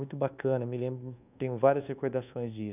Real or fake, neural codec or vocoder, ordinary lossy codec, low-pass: fake; codec, 24 kHz, 1.2 kbps, DualCodec; none; 3.6 kHz